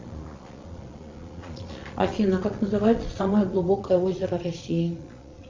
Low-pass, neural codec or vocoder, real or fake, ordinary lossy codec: 7.2 kHz; vocoder, 22.05 kHz, 80 mel bands, Vocos; fake; MP3, 48 kbps